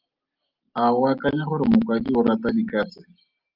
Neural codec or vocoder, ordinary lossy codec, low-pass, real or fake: none; Opus, 32 kbps; 5.4 kHz; real